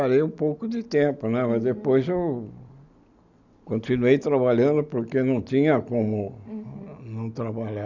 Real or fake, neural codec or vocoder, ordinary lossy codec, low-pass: real; none; none; 7.2 kHz